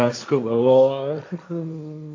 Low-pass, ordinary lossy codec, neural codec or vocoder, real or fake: 7.2 kHz; AAC, 48 kbps; codec, 16 kHz, 1.1 kbps, Voila-Tokenizer; fake